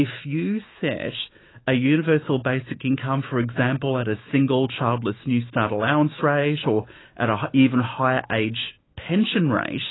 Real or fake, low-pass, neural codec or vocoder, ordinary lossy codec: fake; 7.2 kHz; autoencoder, 48 kHz, 128 numbers a frame, DAC-VAE, trained on Japanese speech; AAC, 16 kbps